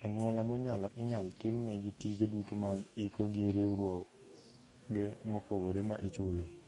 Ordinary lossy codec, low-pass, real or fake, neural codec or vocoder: MP3, 48 kbps; 19.8 kHz; fake; codec, 44.1 kHz, 2.6 kbps, DAC